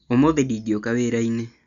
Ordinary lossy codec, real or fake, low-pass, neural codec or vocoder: none; real; 7.2 kHz; none